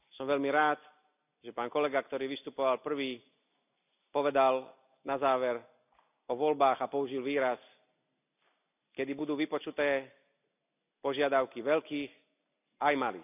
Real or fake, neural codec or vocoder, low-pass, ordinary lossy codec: real; none; 3.6 kHz; none